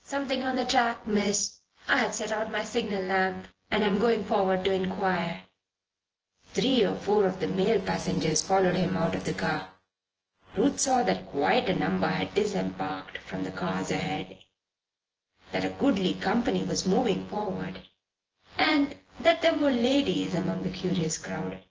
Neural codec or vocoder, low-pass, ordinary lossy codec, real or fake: vocoder, 24 kHz, 100 mel bands, Vocos; 7.2 kHz; Opus, 16 kbps; fake